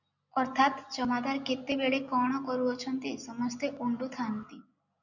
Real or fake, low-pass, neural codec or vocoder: real; 7.2 kHz; none